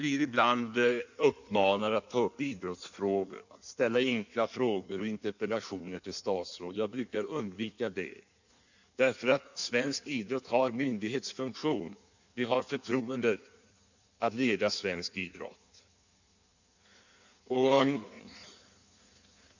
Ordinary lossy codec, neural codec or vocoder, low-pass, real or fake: none; codec, 16 kHz in and 24 kHz out, 1.1 kbps, FireRedTTS-2 codec; 7.2 kHz; fake